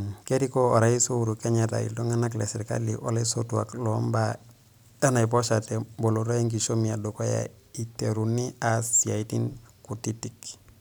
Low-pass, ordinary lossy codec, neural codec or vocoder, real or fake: none; none; none; real